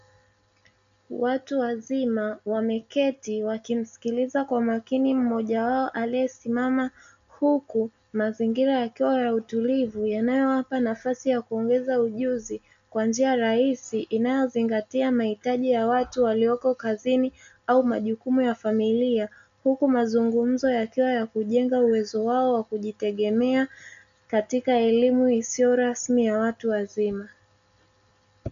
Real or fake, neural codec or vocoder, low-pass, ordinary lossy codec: real; none; 7.2 kHz; MP3, 64 kbps